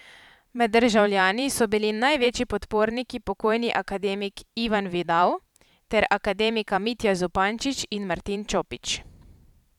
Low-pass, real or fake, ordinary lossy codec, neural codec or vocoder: 19.8 kHz; fake; none; vocoder, 44.1 kHz, 128 mel bands every 512 samples, BigVGAN v2